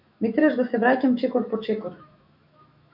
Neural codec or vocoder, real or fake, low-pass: autoencoder, 48 kHz, 128 numbers a frame, DAC-VAE, trained on Japanese speech; fake; 5.4 kHz